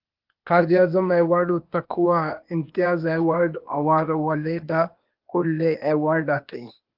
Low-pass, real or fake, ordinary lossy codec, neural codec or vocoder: 5.4 kHz; fake; Opus, 32 kbps; codec, 16 kHz, 0.8 kbps, ZipCodec